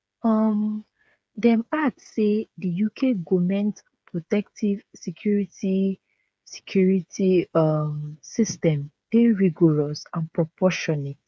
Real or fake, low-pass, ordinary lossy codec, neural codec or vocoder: fake; none; none; codec, 16 kHz, 8 kbps, FreqCodec, smaller model